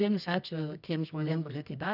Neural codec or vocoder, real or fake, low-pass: codec, 24 kHz, 0.9 kbps, WavTokenizer, medium music audio release; fake; 5.4 kHz